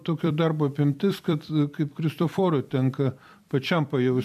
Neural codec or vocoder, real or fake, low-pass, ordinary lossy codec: vocoder, 44.1 kHz, 128 mel bands every 256 samples, BigVGAN v2; fake; 14.4 kHz; AAC, 96 kbps